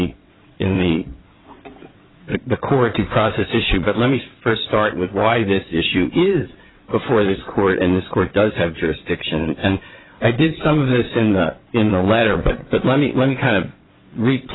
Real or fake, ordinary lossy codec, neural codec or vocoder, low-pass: fake; AAC, 16 kbps; vocoder, 22.05 kHz, 80 mel bands, WaveNeXt; 7.2 kHz